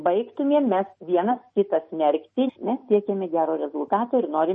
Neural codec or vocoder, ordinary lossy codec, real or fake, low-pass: none; MP3, 32 kbps; real; 9.9 kHz